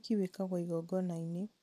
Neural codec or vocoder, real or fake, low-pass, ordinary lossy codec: vocoder, 44.1 kHz, 128 mel bands every 256 samples, BigVGAN v2; fake; 14.4 kHz; none